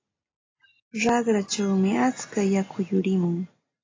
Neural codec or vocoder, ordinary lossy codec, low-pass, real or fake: none; AAC, 32 kbps; 7.2 kHz; real